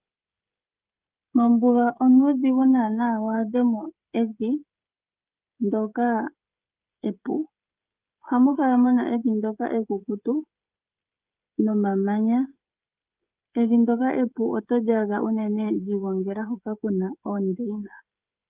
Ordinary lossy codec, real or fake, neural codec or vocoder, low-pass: Opus, 24 kbps; fake; codec, 16 kHz, 16 kbps, FreqCodec, smaller model; 3.6 kHz